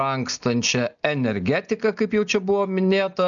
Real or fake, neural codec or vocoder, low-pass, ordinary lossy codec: real; none; 7.2 kHz; MP3, 96 kbps